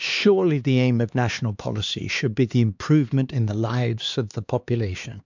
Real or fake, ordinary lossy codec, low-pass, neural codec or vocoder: fake; MP3, 64 kbps; 7.2 kHz; codec, 16 kHz, 2 kbps, X-Codec, HuBERT features, trained on LibriSpeech